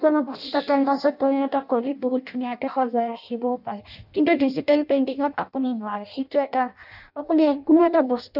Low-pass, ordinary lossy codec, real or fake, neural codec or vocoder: 5.4 kHz; none; fake; codec, 16 kHz in and 24 kHz out, 0.6 kbps, FireRedTTS-2 codec